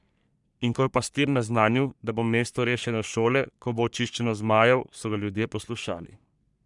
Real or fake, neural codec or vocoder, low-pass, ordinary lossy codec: fake; codec, 44.1 kHz, 3.4 kbps, Pupu-Codec; 10.8 kHz; none